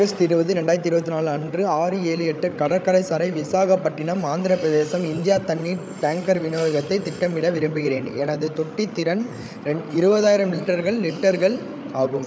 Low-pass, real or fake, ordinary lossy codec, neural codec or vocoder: none; fake; none; codec, 16 kHz, 8 kbps, FreqCodec, larger model